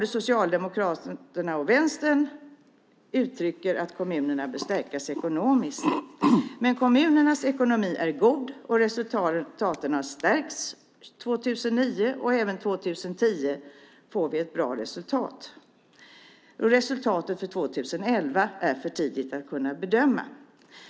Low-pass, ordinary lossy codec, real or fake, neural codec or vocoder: none; none; real; none